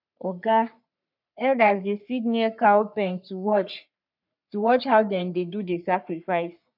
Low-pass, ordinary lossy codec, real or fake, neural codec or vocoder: 5.4 kHz; none; fake; codec, 44.1 kHz, 3.4 kbps, Pupu-Codec